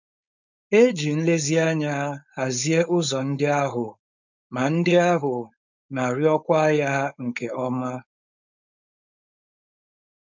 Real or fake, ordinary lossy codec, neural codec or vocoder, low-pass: fake; none; codec, 16 kHz, 4.8 kbps, FACodec; 7.2 kHz